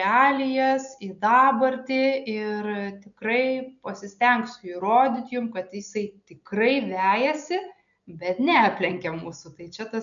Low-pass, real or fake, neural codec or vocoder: 7.2 kHz; real; none